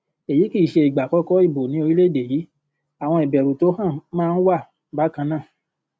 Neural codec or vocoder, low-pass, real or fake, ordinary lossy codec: none; none; real; none